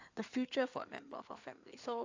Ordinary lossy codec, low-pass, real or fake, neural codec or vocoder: AAC, 48 kbps; 7.2 kHz; fake; codec, 16 kHz in and 24 kHz out, 2.2 kbps, FireRedTTS-2 codec